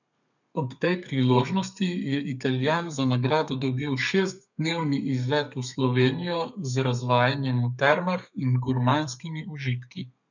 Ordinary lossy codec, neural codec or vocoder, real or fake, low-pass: none; codec, 32 kHz, 1.9 kbps, SNAC; fake; 7.2 kHz